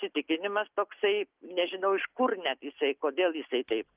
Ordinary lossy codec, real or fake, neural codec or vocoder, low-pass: Opus, 32 kbps; real; none; 3.6 kHz